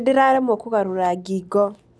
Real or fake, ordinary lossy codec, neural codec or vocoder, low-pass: real; none; none; none